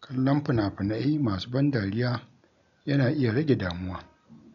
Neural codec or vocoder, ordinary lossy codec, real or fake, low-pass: none; none; real; 7.2 kHz